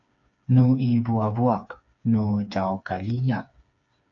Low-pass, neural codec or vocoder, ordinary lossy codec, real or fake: 7.2 kHz; codec, 16 kHz, 4 kbps, FreqCodec, smaller model; MP3, 64 kbps; fake